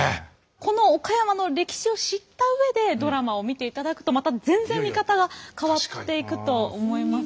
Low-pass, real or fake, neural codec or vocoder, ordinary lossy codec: none; real; none; none